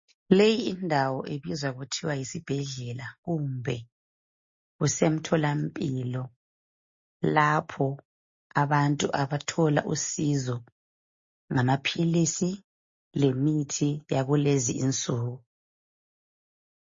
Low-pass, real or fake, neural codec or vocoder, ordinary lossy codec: 7.2 kHz; real; none; MP3, 32 kbps